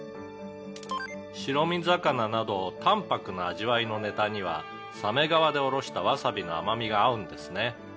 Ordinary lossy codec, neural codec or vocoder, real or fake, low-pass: none; none; real; none